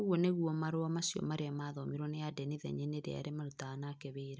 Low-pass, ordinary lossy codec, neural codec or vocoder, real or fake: none; none; none; real